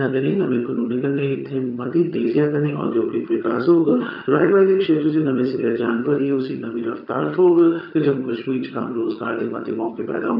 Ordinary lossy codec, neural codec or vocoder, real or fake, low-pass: none; vocoder, 22.05 kHz, 80 mel bands, HiFi-GAN; fake; 5.4 kHz